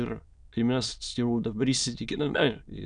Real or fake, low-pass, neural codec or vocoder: fake; 9.9 kHz; autoencoder, 22.05 kHz, a latent of 192 numbers a frame, VITS, trained on many speakers